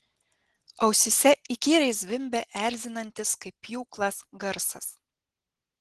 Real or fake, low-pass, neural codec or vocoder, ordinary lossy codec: real; 10.8 kHz; none; Opus, 16 kbps